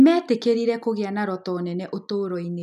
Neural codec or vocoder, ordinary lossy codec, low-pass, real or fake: none; none; 14.4 kHz; real